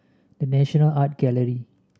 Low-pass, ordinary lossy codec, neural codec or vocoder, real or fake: none; none; none; real